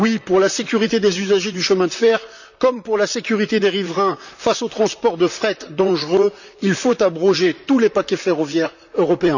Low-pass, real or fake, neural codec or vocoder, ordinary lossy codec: 7.2 kHz; fake; vocoder, 44.1 kHz, 128 mel bands, Pupu-Vocoder; none